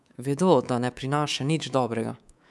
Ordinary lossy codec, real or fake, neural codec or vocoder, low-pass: none; fake; codec, 24 kHz, 3.1 kbps, DualCodec; none